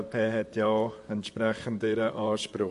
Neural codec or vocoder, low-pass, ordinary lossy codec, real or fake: vocoder, 44.1 kHz, 128 mel bands, Pupu-Vocoder; 14.4 kHz; MP3, 48 kbps; fake